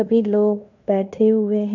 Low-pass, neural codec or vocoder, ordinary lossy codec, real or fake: 7.2 kHz; codec, 16 kHz in and 24 kHz out, 0.9 kbps, LongCat-Audio-Codec, fine tuned four codebook decoder; none; fake